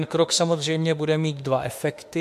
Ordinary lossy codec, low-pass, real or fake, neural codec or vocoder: MP3, 64 kbps; 14.4 kHz; fake; autoencoder, 48 kHz, 32 numbers a frame, DAC-VAE, trained on Japanese speech